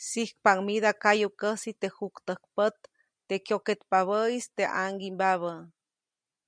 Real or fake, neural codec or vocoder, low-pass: real; none; 9.9 kHz